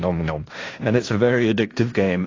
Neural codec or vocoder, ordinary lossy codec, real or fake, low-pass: codec, 16 kHz in and 24 kHz out, 0.9 kbps, LongCat-Audio-Codec, fine tuned four codebook decoder; AAC, 32 kbps; fake; 7.2 kHz